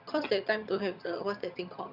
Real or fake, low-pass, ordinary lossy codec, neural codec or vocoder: fake; 5.4 kHz; none; vocoder, 22.05 kHz, 80 mel bands, HiFi-GAN